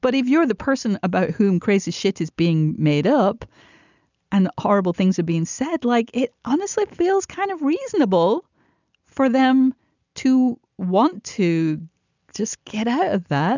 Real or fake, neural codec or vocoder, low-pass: real; none; 7.2 kHz